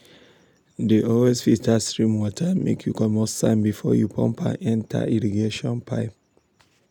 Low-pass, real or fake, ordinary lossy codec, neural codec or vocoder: 19.8 kHz; real; none; none